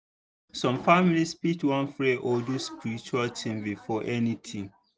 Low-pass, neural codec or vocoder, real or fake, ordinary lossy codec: none; none; real; none